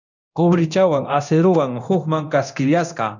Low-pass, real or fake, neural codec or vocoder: 7.2 kHz; fake; codec, 24 kHz, 0.9 kbps, DualCodec